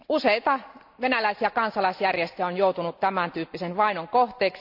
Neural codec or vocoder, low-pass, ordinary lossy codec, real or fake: none; 5.4 kHz; none; real